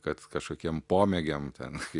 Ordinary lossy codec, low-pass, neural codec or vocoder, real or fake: Opus, 64 kbps; 10.8 kHz; none; real